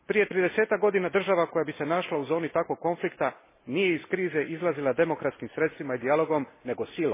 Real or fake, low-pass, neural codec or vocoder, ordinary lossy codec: real; 3.6 kHz; none; MP3, 16 kbps